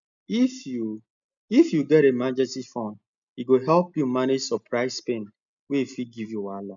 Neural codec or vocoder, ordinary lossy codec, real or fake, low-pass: none; none; real; 7.2 kHz